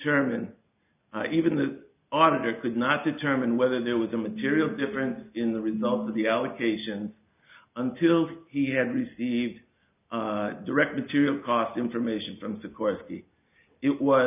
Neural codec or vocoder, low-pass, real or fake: none; 3.6 kHz; real